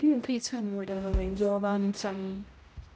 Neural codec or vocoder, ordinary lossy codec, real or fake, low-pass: codec, 16 kHz, 0.5 kbps, X-Codec, HuBERT features, trained on general audio; none; fake; none